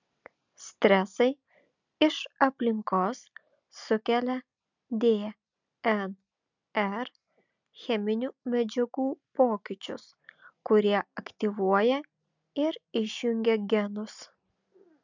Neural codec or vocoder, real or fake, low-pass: none; real; 7.2 kHz